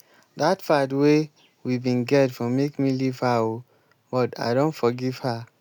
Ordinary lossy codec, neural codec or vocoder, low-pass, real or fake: none; none; none; real